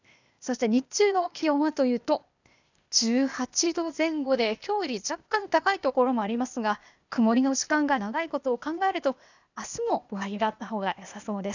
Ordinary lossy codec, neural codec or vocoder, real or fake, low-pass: none; codec, 16 kHz, 0.8 kbps, ZipCodec; fake; 7.2 kHz